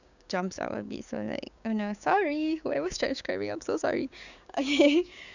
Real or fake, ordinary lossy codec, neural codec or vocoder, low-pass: fake; none; codec, 16 kHz, 6 kbps, DAC; 7.2 kHz